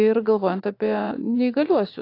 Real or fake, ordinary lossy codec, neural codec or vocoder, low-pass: fake; AAC, 32 kbps; vocoder, 44.1 kHz, 80 mel bands, Vocos; 5.4 kHz